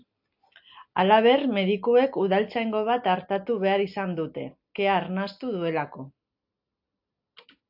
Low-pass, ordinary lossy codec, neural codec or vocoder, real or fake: 5.4 kHz; AAC, 48 kbps; none; real